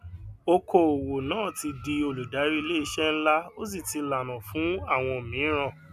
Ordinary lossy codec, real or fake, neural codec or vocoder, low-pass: none; real; none; 14.4 kHz